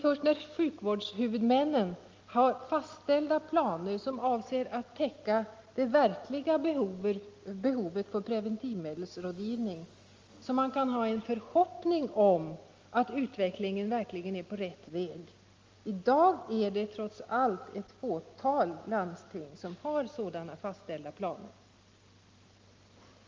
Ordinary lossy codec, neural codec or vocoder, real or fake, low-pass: Opus, 32 kbps; none; real; 7.2 kHz